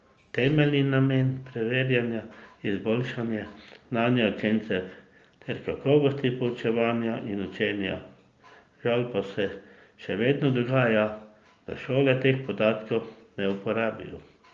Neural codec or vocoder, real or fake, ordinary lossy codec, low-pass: none; real; Opus, 24 kbps; 7.2 kHz